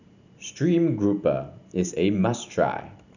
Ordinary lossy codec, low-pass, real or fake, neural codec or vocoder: none; 7.2 kHz; fake; vocoder, 44.1 kHz, 128 mel bands every 512 samples, BigVGAN v2